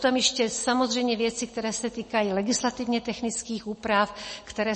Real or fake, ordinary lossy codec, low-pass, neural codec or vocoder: real; MP3, 32 kbps; 10.8 kHz; none